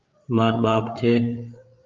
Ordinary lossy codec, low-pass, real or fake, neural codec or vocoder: Opus, 24 kbps; 7.2 kHz; fake; codec, 16 kHz, 8 kbps, FreqCodec, larger model